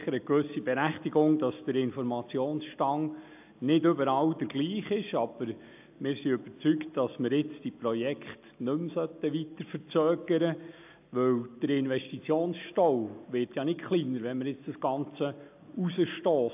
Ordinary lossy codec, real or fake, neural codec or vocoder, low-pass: AAC, 32 kbps; real; none; 3.6 kHz